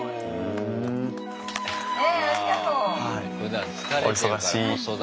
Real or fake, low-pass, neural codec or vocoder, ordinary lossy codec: real; none; none; none